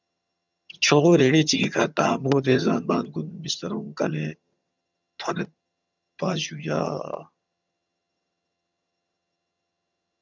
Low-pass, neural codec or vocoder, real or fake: 7.2 kHz; vocoder, 22.05 kHz, 80 mel bands, HiFi-GAN; fake